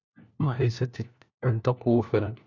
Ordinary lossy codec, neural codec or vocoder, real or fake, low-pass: none; codec, 16 kHz, 1 kbps, FunCodec, trained on LibriTTS, 50 frames a second; fake; 7.2 kHz